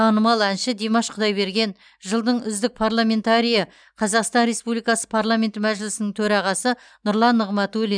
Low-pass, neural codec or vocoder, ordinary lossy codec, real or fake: 9.9 kHz; none; none; real